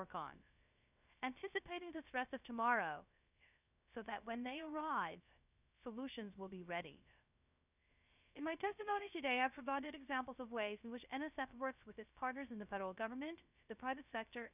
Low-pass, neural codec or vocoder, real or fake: 3.6 kHz; codec, 16 kHz, 0.3 kbps, FocalCodec; fake